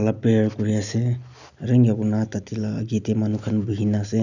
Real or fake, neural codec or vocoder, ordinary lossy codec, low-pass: real; none; none; 7.2 kHz